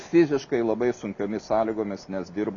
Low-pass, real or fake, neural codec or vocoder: 7.2 kHz; real; none